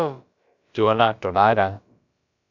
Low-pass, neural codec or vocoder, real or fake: 7.2 kHz; codec, 16 kHz, about 1 kbps, DyCAST, with the encoder's durations; fake